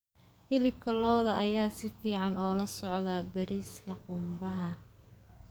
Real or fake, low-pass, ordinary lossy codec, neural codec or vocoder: fake; none; none; codec, 44.1 kHz, 2.6 kbps, SNAC